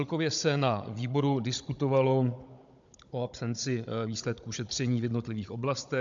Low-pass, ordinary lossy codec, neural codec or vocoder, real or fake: 7.2 kHz; MP3, 64 kbps; codec, 16 kHz, 16 kbps, FunCodec, trained on Chinese and English, 50 frames a second; fake